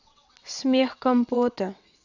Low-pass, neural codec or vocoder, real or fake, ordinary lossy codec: 7.2 kHz; vocoder, 22.05 kHz, 80 mel bands, WaveNeXt; fake; none